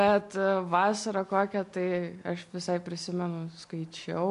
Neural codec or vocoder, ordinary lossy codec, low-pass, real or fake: none; MP3, 64 kbps; 10.8 kHz; real